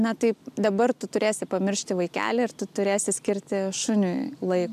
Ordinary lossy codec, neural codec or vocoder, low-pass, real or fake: AAC, 96 kbps; none; 14.4 kHz; real